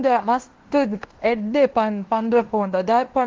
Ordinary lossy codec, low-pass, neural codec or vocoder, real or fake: Opus, 16 kbps; 7.2 kHz; codec, 16 kHz in and 24 kHz out, 0.9 kbps, LongCat-Audio-Codec, fine tuned four codebook decoder; fake